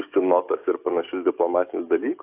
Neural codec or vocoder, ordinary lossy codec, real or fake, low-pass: codec, 44.1 kHz, 7.8 kbps, DAC; AAC, 32 kbps; fake; 3.6 kHz